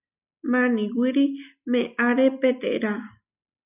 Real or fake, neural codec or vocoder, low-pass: real; none; 3.6 kHz